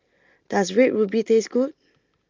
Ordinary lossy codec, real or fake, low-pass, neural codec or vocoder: Opus, 24 kbps; real; 7.2 kHz; none